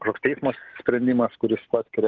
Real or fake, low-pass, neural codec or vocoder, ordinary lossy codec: real; 7.2 kHz; none; Opus, 16 kbps